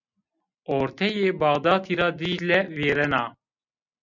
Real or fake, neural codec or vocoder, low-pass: real; none; 7.2 kHz